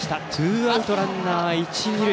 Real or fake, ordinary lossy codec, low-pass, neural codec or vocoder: real; none; none; none